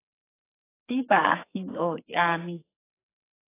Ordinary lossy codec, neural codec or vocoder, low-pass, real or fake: AAC, 24 kbps; codec, 44.1 kHz, 2.6 kbps, SNAC; 3.6 kHz; fake